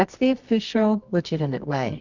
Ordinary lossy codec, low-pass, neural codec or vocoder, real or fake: Opus, 64 kbps; 7.2 kHz; codec, 24 kHz, 0.9 kbps, WavTokenizer, medium music audio release; fake